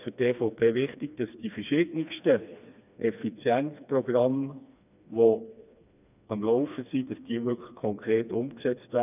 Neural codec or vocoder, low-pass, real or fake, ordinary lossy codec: codec, 16 kHz, 2 kbps, FreqCodec, smaller model; 3.6 kHz; fake; none